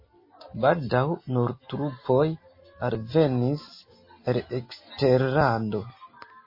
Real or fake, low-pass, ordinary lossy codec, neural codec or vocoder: real; 5.4 kHz; MP3, 24 kbps; none